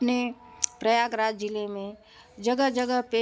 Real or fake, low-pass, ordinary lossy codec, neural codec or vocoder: real; none; none; none